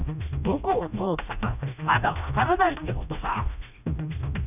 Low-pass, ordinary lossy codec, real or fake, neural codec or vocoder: 3.6 kHz; none; fake; codec, 16 kHz, 1 kbps, FreqCodec, smaller model